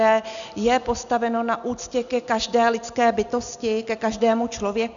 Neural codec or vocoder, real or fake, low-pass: none; real; 7.2 kHz